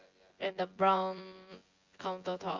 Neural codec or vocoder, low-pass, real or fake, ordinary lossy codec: vocoder, 24 kHz, 100 mel bands, Vocos; 7.2 kHz; fake; Opus, 32 kbps